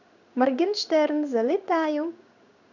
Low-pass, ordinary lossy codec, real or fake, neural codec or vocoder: 7.2 kHz; none; fake; codec, 16 kHz in and 24 kHz out, 1 kbps, XY-Tokenizer